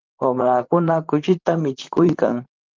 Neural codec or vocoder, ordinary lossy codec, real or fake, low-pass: vocoder, 44.1 kHz, 128 mel bands, Pupu-Vocoder; Opus, 32 kbps; fake; 7.2 kHz